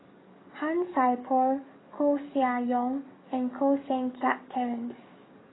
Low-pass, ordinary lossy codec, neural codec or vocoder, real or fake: 7.2 kHz; AAC, 16 kbps; codec, 44.1 kHz, 7.8 kbps, DAC; fake